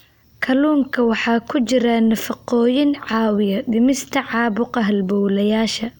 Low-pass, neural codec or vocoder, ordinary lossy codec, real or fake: 19.8 kHz; none; none; real